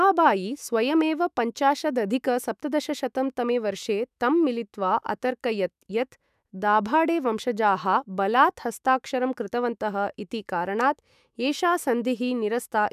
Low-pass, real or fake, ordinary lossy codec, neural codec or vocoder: 14.4 kHz; fake; none; autoencoder, 48 kHz, 128 numbers a frame, DAC-VAE, trained on Japanese speech